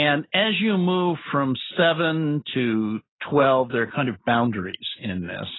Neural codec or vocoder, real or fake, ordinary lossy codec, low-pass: none; real; AAC, 16 kbps; 7.2 kHz